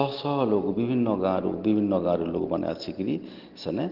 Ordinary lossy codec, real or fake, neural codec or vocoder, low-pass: Opus, 16 kbps; real; none; 5.4 kHz